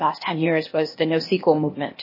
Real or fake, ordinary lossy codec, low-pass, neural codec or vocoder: fake; MP3, 24 kbps; 5.4 kHz; codec, 16 kHz, 0.8 kbps, ZipCodec